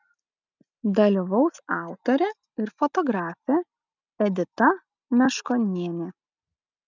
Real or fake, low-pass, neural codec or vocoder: real; 7.2 kHz; none